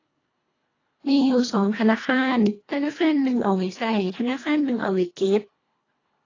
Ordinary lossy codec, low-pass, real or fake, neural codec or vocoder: AAC, 32 kbps; 7.2 kHz; fake; codec, 24 kHz, 1.5 kbps, HILCodec